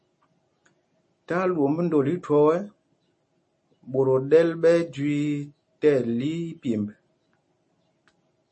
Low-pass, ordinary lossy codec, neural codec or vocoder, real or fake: 9.9 kHz; MP3, 32 kbps; none; real